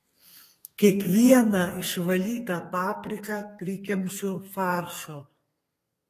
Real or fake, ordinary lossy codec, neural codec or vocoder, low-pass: fake; AAC, 48 kbps; codec, 32 kHz, 1.9 kbps, SNAC; 14.4 kHz